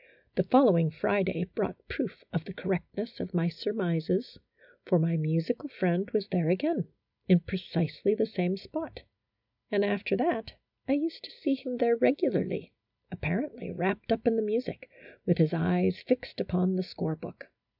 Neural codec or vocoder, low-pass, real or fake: none; 5.4 kHz; real